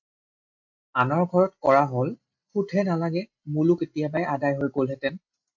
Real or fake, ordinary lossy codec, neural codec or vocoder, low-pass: real; MP3, 64 kbps; none; 7.2 kHz